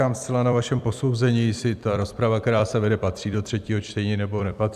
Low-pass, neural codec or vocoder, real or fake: 14.4 kHz; vocoder, 44.1 kHz, 128 mel bands every 256 samples, BigVGAN v2; fake